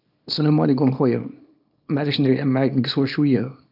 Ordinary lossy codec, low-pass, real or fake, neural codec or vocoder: none; 5.4 kHz; fake; codec, 24 kHz, 0.9 kbps, WavTokenizer, small release